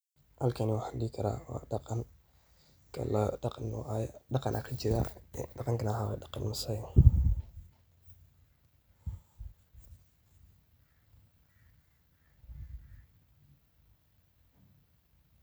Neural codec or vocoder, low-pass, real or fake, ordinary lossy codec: none; none; real; none